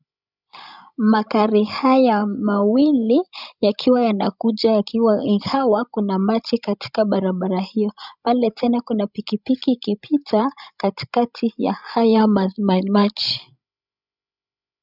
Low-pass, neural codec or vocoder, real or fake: 5.4 kHz; codec, 16 kHz, 16 kbps, FreqCodec, larger model; fake